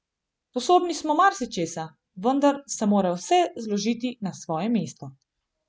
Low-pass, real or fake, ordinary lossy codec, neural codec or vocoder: none; real; none; none